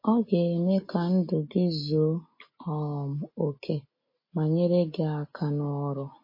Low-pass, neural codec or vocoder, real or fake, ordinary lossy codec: 5.4 kHz; none; real; MP3, 24 kbps